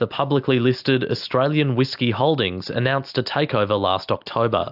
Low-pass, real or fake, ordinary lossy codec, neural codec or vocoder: 5.4 kHz; real; AAC, 48 kbps; none